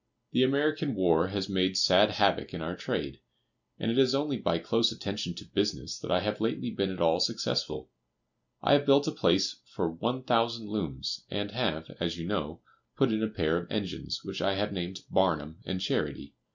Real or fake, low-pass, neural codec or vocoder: real; 7.2 kHz; none